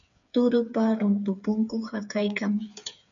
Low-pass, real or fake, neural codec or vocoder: 7.2 kHz; fake; codec, 16 kHz, 8 kbps, FreqCodec, smaller model